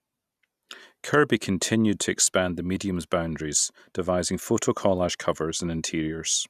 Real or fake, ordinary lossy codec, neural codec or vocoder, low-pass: real; none; none; 14.4 kHz